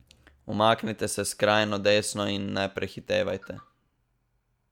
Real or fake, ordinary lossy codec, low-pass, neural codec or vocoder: real; MP3, 96 kbps; 19.8 kHz; none